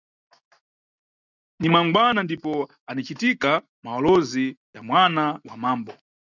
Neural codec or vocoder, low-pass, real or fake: none; 7.2 kHz; real